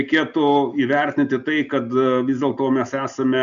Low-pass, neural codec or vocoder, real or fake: 7.2 kHz; none; real